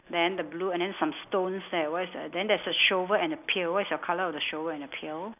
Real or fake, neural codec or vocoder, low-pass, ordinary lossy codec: real; none; 3.6 kHz; none